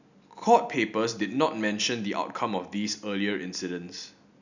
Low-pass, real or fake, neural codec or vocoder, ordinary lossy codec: 7.2 kHz; real; none; none